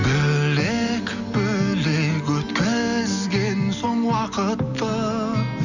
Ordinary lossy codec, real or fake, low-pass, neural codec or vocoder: none; real; 7.2 kHz; none